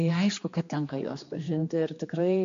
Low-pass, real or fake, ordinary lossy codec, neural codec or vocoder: 7.2 kHz; fake; AAC, 48 kbps; codec, 16 kHz, 2 kbps, X-Codec, HuBERT features, trained on general audio